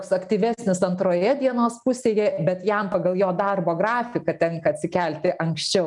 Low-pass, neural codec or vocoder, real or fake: 10.8 kHz; none; real